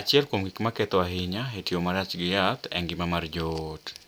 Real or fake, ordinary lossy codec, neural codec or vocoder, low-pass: real; none; none; none